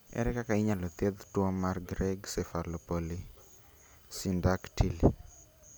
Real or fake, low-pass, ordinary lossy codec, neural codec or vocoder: real; none; none; none